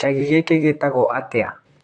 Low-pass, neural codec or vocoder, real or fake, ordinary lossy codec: 10.8 kHz; vocoder, 44.1 kHz, 128 mel bands, Pupu-Vocoder; fake; AAC, 48 kbps